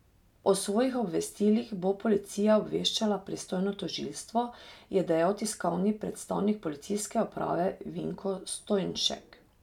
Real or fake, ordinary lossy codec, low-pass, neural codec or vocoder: real; none; 19.8 kHz; none